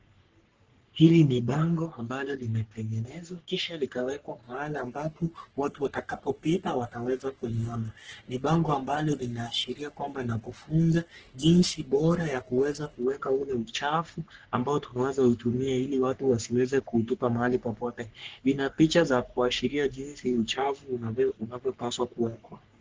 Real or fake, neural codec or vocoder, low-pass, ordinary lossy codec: fake; codec, 44.1 kHz, 3.4 kbps, Pupu-Codec; 7.2 kHz; Opus, 16 kbps